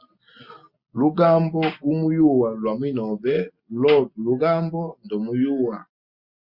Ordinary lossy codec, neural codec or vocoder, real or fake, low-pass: Opus, 64 kbps; none; real; 5.4 kHz